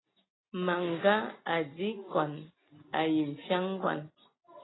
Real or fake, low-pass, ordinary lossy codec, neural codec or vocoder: real; 7.2 kHz; AAC, 16 kbps; none